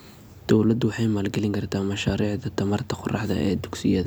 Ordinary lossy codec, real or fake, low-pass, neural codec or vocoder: none; real; none; none